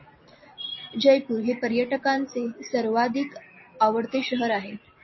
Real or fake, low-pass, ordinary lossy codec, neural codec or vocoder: real; 7.2 kHz; MP3, 24 kbps; none